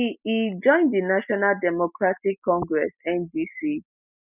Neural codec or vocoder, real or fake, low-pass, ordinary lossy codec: none; real; 3.6 kHz; none